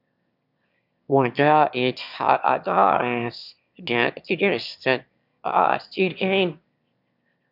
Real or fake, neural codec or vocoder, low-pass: fake; autoencoder, 22.05 kHz, a latent of 192 numbers a frame, VITS, trained on one speaker; 5.4 kHz